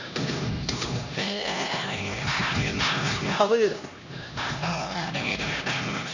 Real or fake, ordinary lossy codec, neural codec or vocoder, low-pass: fake; none; codec, 16 kHz, 1 kbps, X-Codec, HuBERT features, trained on LibriSpeech; 7.2 kHz